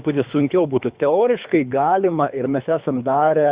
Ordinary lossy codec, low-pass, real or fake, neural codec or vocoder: Opus, 64 kbps; 3.6 kHz; fake; codec, 24 kHz, 3 kbps, HILCodec